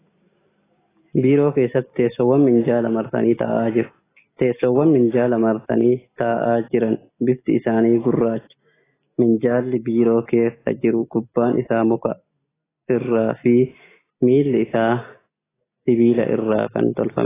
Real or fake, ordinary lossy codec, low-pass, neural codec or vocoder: real; AAC, 16 kbps; 3.6 kHz; none